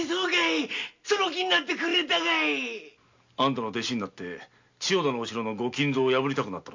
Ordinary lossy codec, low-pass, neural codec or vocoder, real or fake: MP3, 64 kbps; 7.2 kHz; none; real